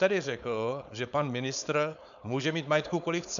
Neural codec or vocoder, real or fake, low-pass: codec, 16 kHz, 4.8 kbps, FACodec; fake; 7.2 kHz